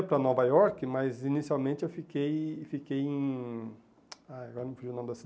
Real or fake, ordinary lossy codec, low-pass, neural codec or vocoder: real; none; none; none